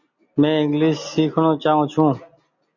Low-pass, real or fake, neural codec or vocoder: 7.2 kHz; real; none